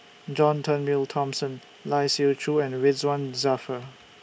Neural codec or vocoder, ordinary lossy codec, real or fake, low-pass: none; none; real; none